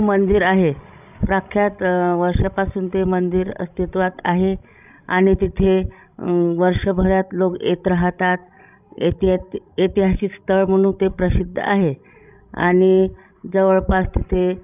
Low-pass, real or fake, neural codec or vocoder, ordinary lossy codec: 3.6 kHz; fake; codec, 16 kHz, 16 kbps, FreqCodec, larger model; none